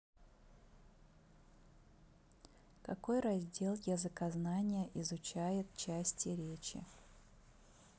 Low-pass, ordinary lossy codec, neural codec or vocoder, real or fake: none; none; none; real